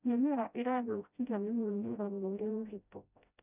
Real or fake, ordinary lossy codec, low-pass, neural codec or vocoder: fake; none; 3.6 kHz; codec, 16 kHz, 0.5 kbps, FreqCodec, smaller model